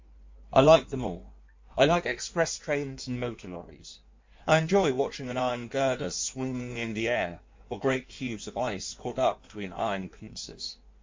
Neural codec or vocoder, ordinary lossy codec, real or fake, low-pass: codec, 16 kHz in and 24 kHz out, 1.1 kbps, FireRedTTS-2 codec; MP3, 64 kbps; fake; 7.2 kHz